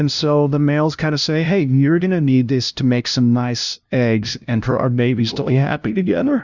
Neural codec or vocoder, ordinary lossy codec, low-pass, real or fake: codec, 16 kHz, 0.5 kbps, FunCodec, trained on LibriTTS, 25 frames a second; Opus, 64 kbps; 7.2 kHz; fake